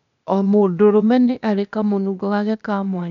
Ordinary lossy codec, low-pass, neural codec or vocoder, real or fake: none; 7.2 kHz; codec, 16 kHz, 0.8 kbps, ZipCodec; fake